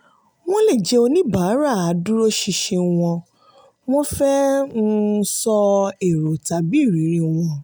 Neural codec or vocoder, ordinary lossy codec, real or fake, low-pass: none; none; real; none